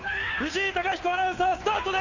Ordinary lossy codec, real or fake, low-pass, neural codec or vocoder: none; fake; 7.2 kHz; codec, 16 kHz, 2 kbps, FunCodec, trained on Chinese and English, 25 frames a second